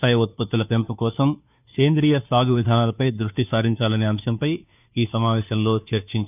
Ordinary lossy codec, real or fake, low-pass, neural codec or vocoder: none; fake; 3.6 kHz; codec, 16 kHz, 2 kbps, FunCodec, trained on Chinese and English, 25 frames a second